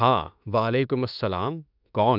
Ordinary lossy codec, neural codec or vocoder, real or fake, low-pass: none; autoencoder, 48 kHz, 32 numbers a frame, DAC-VAE, trained on Japanese speech; fake; 5.4 kHz